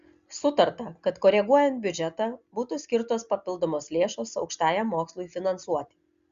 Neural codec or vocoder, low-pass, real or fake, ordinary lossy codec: none; 7.2 kHz; real; Opus, 64 kbps